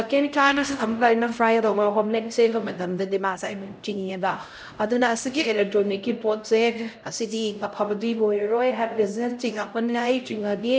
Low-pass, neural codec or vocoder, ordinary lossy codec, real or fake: none; codec, 16 kHz, 0.5 kbps, X-Codec, HuBERT features, trained on LibriSpeech; none; fake